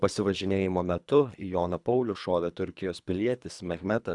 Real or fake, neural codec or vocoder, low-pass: fake; codec, 24 kHz, 3 kbps, HILCodec; 10.8 kHz